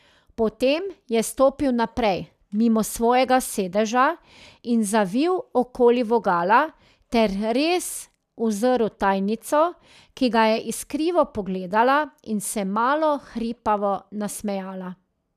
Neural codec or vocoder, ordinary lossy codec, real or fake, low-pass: none; none; real; 14.4 kHz